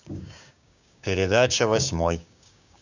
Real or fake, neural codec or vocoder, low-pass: fake; codec, 16 kHz, 6 kbps, DAC; 7.2 kHz